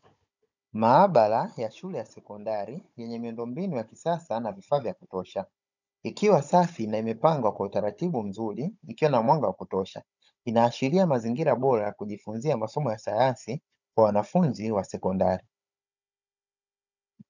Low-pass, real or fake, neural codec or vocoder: 7.2 kHz; fake; codec, 16 kHz, 16 kbps, FunCodec, trained on Chinese and English, 50 frames a second